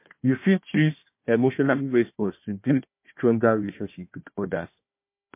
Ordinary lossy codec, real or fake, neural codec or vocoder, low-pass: MP3, 24 kbps; fake; codec, 16 kHz, 1 kbps, FunCodec, trained on Chinese and English, 50 frames a second; 3.6 kHz